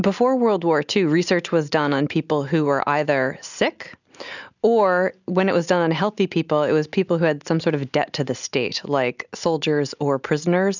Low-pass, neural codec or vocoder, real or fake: 7.2 kHz; none; real